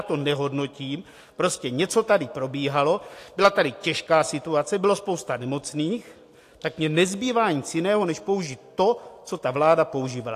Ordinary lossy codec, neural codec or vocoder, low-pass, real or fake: AAC, 64 kbps; none; 14.4 kHz; real